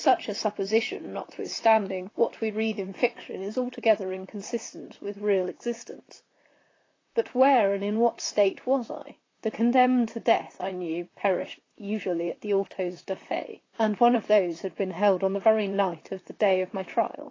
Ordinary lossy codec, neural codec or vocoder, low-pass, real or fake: AAC, 32 kbps; vocoder, 44.1 kHz, 128 mel bands, Pupu-Vocoder; 7.2 kHz; fake